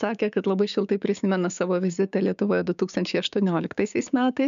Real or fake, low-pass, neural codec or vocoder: fake; 7.2 kHz; codec, 16 kHz, 6 kbps, DAC